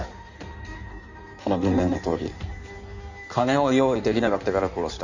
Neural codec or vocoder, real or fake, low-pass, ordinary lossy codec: codec, 16 kHz in and 24 kHz out, 1.1 kbps, FireRedTTS-2 codec; fake; 7.2 kHz; none